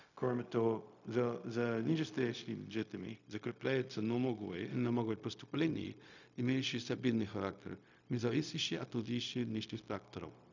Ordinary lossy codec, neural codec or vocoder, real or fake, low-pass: none; codec, 16 kHz, 0.4 kbps, LongCat-Audio-Codec; fake; 7.2 kHz